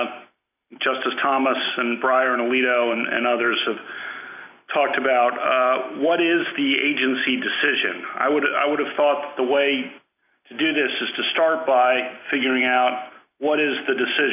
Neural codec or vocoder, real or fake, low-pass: none; real; 3.6 kHz